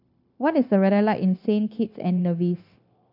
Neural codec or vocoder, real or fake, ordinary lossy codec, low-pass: codec, 16 kHz, 0.9 kbps, LongCat-Audio-Codec; fake; none; 5.4 kHz